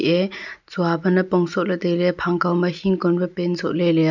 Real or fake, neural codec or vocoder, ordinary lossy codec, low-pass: real; none; none; 7.2 kHz